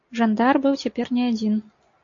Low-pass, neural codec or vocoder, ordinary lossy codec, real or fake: 7.2 kHz; none; AAC, 48 kbps; real